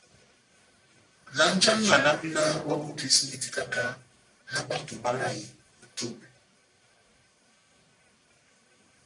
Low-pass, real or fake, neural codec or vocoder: 10.8 kHz; fake; codec, 44.1 kHz, 1.7 kbps, Pupu-Codec